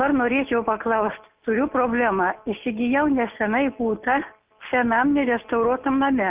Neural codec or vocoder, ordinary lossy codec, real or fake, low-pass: none; Opus, 24 kbps; real; 3.6 kHz